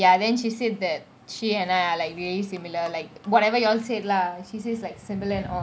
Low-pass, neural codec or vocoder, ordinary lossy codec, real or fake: none; none; none; real